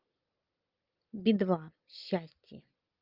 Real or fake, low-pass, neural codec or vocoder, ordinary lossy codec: real; 5.4 kHz; none; Opus, 24 kbps